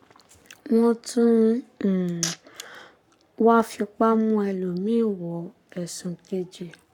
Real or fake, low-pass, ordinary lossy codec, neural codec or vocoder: fake; 19.8 kHz; none; codec, 44.1 kHz, 7.8 kbps, Pupu-Codec